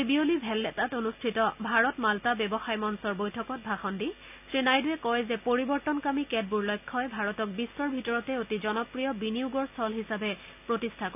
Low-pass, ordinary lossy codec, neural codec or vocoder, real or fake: 3.6 kHz; none; none; real